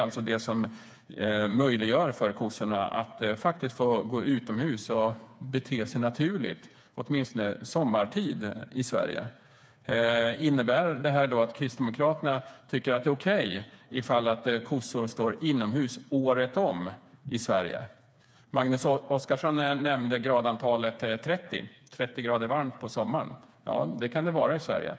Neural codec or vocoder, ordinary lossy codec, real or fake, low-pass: codec, 16 kHz, 4 kbps, FreqCodec, smaller model; none; fake; none